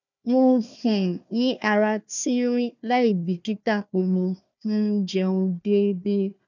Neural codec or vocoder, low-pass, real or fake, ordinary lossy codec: codec, 16 kHz, 1 kbps, FunCodec, trained on Chinese and English, 50 frames a second; 7.2 kHz; fake; none